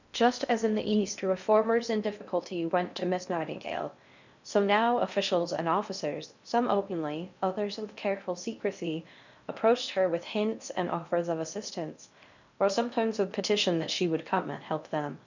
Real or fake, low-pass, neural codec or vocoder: fake; 7.2 kHz; codec, 16 kHz in and 24 kHz out, 0.6 kbps, FocalCodec, streaming, 2048 codes